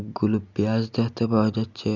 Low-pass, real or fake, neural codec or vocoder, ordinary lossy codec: 7.2 kHz; real; none; none